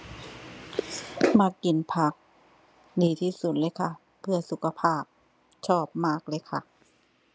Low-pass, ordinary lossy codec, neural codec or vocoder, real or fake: none; none; none; real